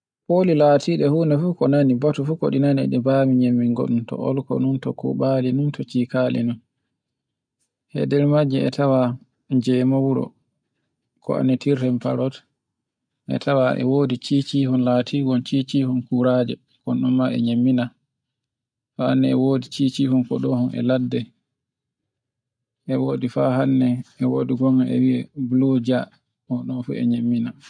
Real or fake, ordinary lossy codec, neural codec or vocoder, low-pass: real; none; none; 9.9 kHz